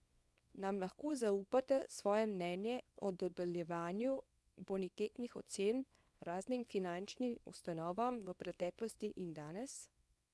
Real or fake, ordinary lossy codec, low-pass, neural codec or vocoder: fake; none; none; codec, 24 kHz, 0.9 kbps, WavTokenizer, small release